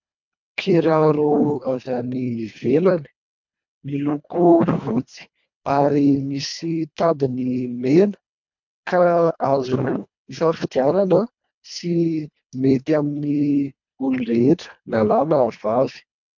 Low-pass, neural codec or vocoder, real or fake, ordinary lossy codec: 7.2 kHz; codec, 24 kHz, 1.5 kbps, HILCodec; fake; MP3, 64 kbps